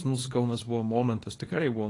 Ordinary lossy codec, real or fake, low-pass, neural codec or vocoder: AAC, 32 kbps; fake; 10.8 kHz; codec, 24 kHz, 0.9 kbps, WavTokenizer, small release